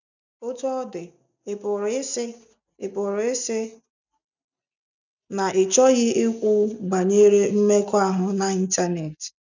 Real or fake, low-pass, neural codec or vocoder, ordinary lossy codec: real; 7.2 kHz; none; none